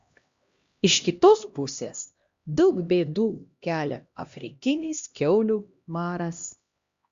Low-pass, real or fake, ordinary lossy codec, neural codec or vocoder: 7.2 kHz; fake; Opus, 64 kbps; codec, 16 kHz, 1 kbps, X-Codec, HuBERT features, trained on LibriSpeech